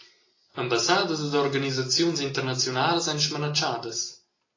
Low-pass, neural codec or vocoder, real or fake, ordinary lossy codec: 7.2 kHz; none; real; AAC, 32 kbps